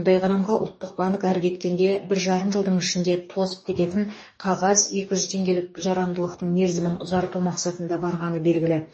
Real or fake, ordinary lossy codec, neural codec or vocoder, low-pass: fake; MP3, 32 kbps; codec, 44.1 kHz, 2.6 kbps, DAC; 9.9 kHz